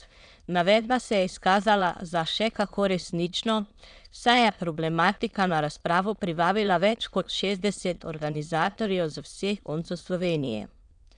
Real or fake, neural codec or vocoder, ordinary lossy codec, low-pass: fake; autoencoder, 22.05 kHz, a latent of 192 numbers a frame, VITS, trained on many speakers; none; 9.9 kHz